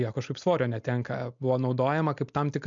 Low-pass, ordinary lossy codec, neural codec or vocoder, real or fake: 7.2 kHz; MP3, 64 kbps; none; real